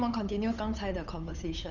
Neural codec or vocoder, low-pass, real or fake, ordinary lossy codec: codec, 16 kHz, 8 kbps, FunCodec, trained on Chinese and English, 25 frames a second; 7.2 kHz; fake; none